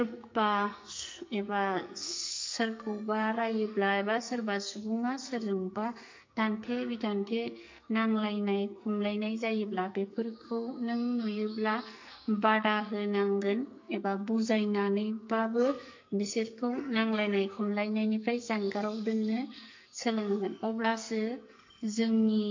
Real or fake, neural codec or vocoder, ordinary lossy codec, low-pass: fake; codec, 44.1 kHz, 2.6 kbps, SNAC; MP3, 48 kbps; 7.2 kHz